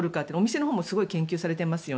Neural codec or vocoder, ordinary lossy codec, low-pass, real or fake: none; none; none; real